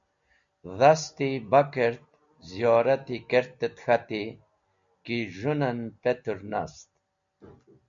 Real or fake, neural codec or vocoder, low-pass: real; none; 7.2 kHz